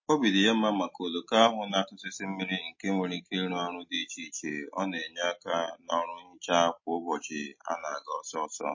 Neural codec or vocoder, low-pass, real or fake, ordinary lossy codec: none; 7.2 kHz; real; MP3, 32 kbps